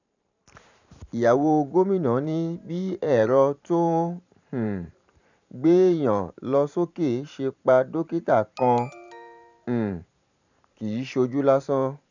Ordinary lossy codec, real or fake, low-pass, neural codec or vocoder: none; fake; 7.2 kHz; vocoder, 44.1 kHz, 128 mel bands every 512 samples, BigVGAN v2